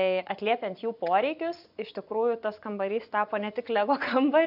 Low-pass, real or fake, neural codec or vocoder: 5.4 kHz; fake; vocoder, 24 kHz, 100 mel bands, Vocos